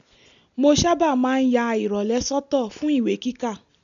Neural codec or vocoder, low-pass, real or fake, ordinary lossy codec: none; 7.2 kHz; real; none